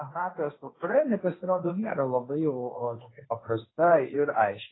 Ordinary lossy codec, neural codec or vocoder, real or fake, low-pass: AAC, 16 kbps; codec, 16 kHz, 1.1 kbps, Voila-Tokenizer; fake; 7.2 kHz